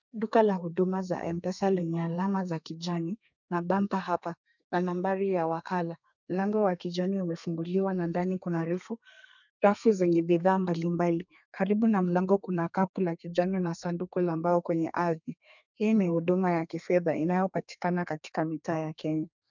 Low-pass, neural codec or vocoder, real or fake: 7.2 kHz; codec, 32 kHz, 1.9 kbps, SNAC; fake